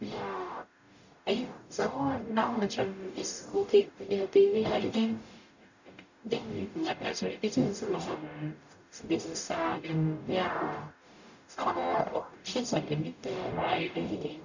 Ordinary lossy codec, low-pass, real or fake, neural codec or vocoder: none; 7.2 kHz; fake; codec, 44.1 kHz, 0.9 kbps, DAC